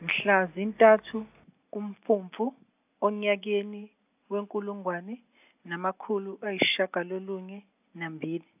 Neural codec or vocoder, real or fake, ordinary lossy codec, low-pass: none; real; AAC, 32 kbps; 3.6 kHz